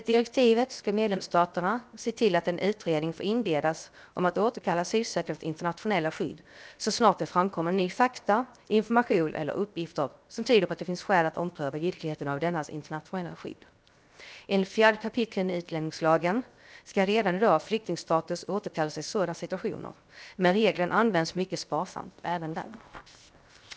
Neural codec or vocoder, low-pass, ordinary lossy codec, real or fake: codec, 16 kHz, 0.7 kbps, FocalCodec; none; none; fake